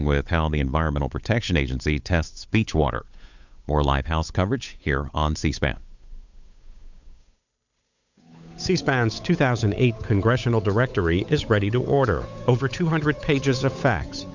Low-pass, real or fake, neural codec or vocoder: 7.2 kHz; fake; codec, 16 kHz, 8 kbps, FunCodec, trained on Chinese and English, 25 frames a second